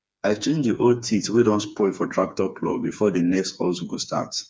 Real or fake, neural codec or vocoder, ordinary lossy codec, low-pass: fake; codec, 16 kHz, 4 kbps, FreqCodec, smaller model; none; none